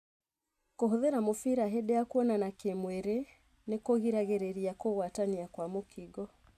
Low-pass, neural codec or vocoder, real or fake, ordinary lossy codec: 14.4 kHz; none; real; none